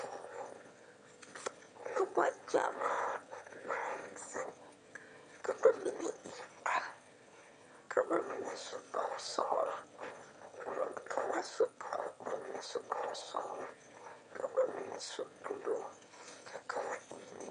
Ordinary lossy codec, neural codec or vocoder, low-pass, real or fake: MP3, 64 kbps; autoencoder, 22.05 kHz, a latent of 192 numbers a frame, VITS, trained on one speaker; 9.9 kHz; fake